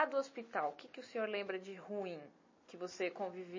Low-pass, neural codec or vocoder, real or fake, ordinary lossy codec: 7.2 kHz; none; real; MP3, 32 kbps